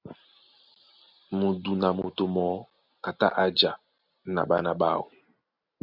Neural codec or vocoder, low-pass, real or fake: none; 5.4 kHz; real